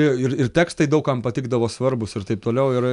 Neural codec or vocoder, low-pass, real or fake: none; 10.8 kHz; real